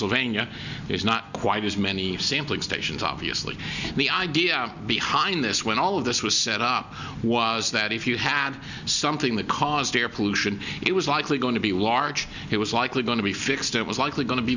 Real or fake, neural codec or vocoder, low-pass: real; none; 7.2 kHz